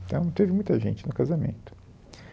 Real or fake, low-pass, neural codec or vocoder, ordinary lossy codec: real; none; none; none